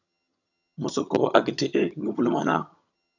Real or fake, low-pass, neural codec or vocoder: fake; 7.2 kHz; vocoder, 22.05 kHz, 80 mel bands, HiFi-GAN